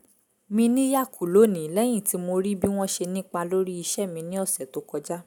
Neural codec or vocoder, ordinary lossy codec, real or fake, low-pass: none; none; real; 19.8 kHz